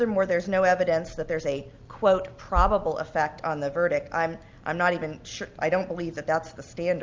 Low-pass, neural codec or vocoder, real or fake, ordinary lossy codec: 7.2 kHz; none; real; Opus, 24 kbps